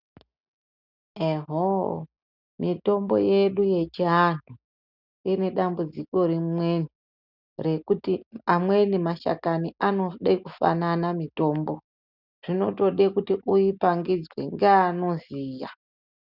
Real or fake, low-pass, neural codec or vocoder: real; 5.4 kHz; none